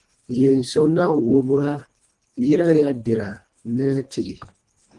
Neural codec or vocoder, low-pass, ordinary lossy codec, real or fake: codec, 24 kHz, 1.5 kbps, HILCodec; 10.8 kHz; Opus, 24 kbps; fake